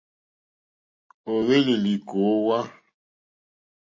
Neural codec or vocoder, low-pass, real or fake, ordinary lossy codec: none; 7.2 kHz; real; MP3, 32 kbps